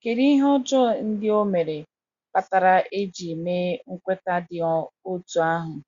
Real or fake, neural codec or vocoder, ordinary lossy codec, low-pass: real; none; Opus, 64 kbps; 7.2 kHz